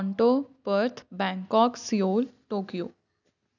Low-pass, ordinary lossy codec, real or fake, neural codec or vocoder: 7.2 kHz; none; real; none